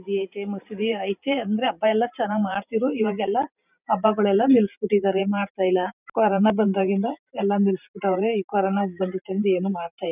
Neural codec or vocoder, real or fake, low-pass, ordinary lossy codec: vocoder, 44.1 kHz, 128 mel bands every 512 samples, BigVGAN v2; fake; 3.6 kHz; none